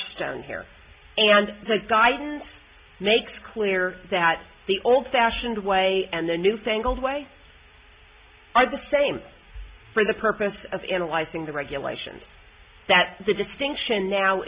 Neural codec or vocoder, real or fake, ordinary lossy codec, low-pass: none; real; Opus, 64 kbps; 3.6 kHz